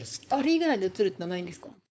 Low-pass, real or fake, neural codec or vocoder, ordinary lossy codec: none; fake; codec, 16 kHz, 4.8 kbps, FACodec; none